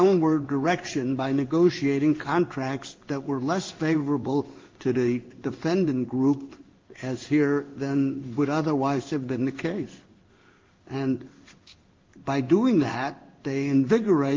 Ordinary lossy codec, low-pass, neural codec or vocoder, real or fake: Opus, 32 kbps; 7.2 kHz; codec, 16 kHz in and 24 kHz out, 1 kbps, XY-Tokenizer; fake